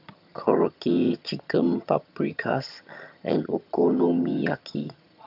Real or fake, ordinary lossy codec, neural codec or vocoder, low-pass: fake; AAC, 48 kbps; vocoder, 22.05 kHz, 80 mel bands, HiFi-GAN; 5.4 kHz